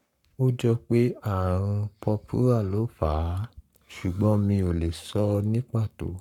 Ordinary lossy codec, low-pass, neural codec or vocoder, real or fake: none; 19.8 kHz; codec, 44.1 kHz, 7.8 kbps, Pupu-Codec; fake